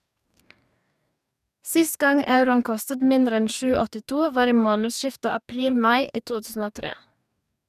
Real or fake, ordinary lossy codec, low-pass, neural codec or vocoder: fake; none; 14.4 kHz; codec, 44.1 kHz, 2.6 kbps, DAC